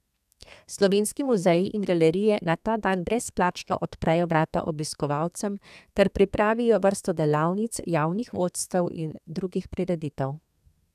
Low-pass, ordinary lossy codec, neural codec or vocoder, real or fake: 14.4 kHz; none; codec, 32 kHz, 1.9 kbps, SNAC; fake